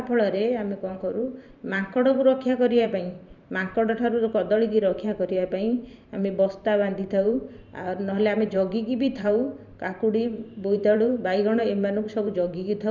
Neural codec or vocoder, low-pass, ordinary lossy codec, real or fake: vocoder, 44.1 kHz, 128 mel bands every 512 samples, BigVGAN v2; 7.2 kHz; none; fake